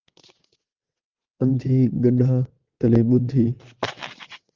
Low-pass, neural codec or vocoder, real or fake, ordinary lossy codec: 7.2 kHz; vocoder, 22.05 kHz, 80 mel bands, WaveNeXt; fake; Opus, 32 kbps